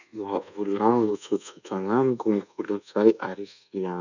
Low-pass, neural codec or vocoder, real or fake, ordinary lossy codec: 7.2 kHz; codec, 24 kHz, 1.2 kbps, DualCodec; fake; none